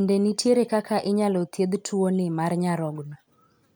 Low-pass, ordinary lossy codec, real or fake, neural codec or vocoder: none; none; real; none